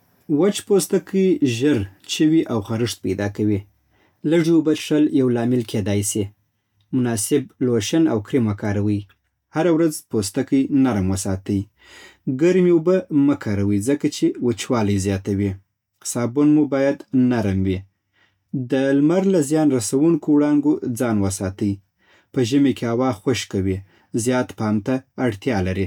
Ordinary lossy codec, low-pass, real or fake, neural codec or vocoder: none; 19.8 kHz; real; none